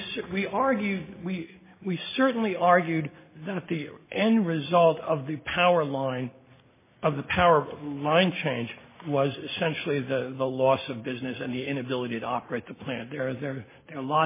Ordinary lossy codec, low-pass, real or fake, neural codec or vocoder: MP3, 16 kbps; 3.6 kHz; real; none